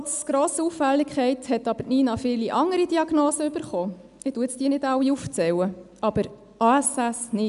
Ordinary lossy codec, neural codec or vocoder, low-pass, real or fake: none; none; 10.8 kHz; real